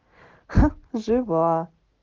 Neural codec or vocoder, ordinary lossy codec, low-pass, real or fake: none; Opus, 16 kbps; 7.2 kHz; real